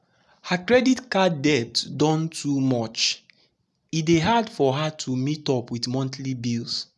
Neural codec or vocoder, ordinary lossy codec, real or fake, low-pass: none; none; real; none